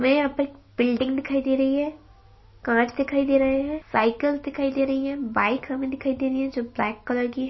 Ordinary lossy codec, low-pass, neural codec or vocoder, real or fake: MP3, 24 kbps; 7.2 kHz; none; real